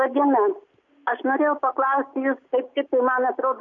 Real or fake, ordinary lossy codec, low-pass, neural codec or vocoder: real; MP3, 48 kbps; 7.2 kHz; none